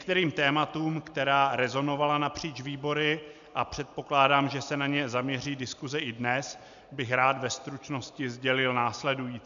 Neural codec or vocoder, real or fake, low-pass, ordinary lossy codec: none; real; 7.2 kHz; MP3, 96 kbps